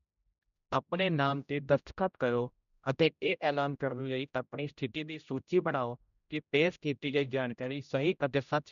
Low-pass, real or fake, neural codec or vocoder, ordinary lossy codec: 7.2 kHz; fake; codec, 16 kHz, 0.5 kbps, X-Codec, HuBERT features, trained on general audio; none